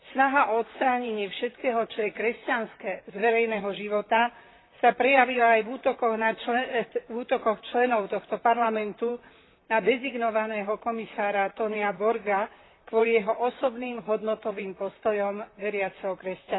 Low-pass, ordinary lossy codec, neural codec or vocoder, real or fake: 7.2 kHz; AAC, 16 kbps; vocoder, 44.1 kHz, 128 mel bands, Pupu-Vocoder; fake